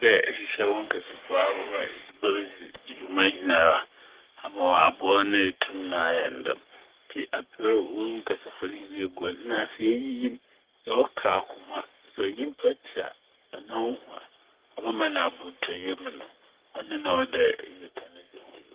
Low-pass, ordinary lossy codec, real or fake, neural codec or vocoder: 3.6 kHz; Opus, 16 kbps; fake; codec, 44.1 kHz, 3.4 kbps, Pupu-Codec